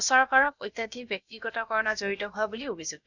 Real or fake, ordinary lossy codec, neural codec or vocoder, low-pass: fake; none; codec, 16 kHz, about 1 kbps, DyCAST, with the encoder's durations; 7.2 kHz